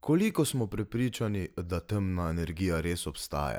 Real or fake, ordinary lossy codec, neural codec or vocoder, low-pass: real; none; none; none